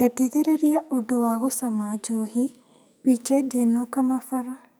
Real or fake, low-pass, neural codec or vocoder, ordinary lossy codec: fake; none; codec, 44.1 kHz, 2.6 kbps, SNAC; none